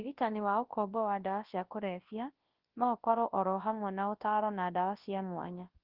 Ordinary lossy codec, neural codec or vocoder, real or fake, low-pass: Opus, 16 kbps; codec, 24 kHz, 0.9 kbps, WavTokenizer, large speech release; fake; 5.4 kHz